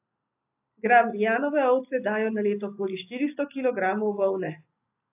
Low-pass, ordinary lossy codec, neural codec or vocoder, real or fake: 3.6 kHz; AAC, 32 kbps; none; real